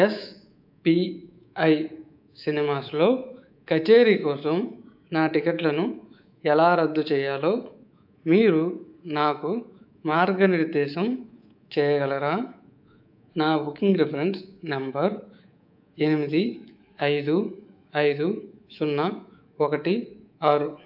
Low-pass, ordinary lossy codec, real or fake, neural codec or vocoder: 5.4 kHz; AAC, 48 kbps; fake; codec, 24 kHz, 3.1 kbps, DualCodec